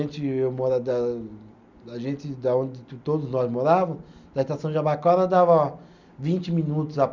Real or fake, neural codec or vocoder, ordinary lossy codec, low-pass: real; none; none; 7.2 kHz